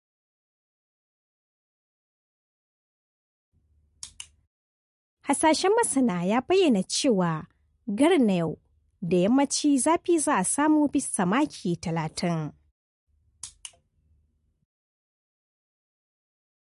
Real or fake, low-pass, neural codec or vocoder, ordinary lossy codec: real; 14.4 kHz; none; MP3, 48 kbps